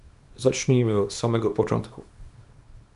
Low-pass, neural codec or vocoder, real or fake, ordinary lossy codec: 10.8 kHz; codec, 24 kHz, 0.9 kbps, WavTokenizer, small release; fake; MP3, 96 kbps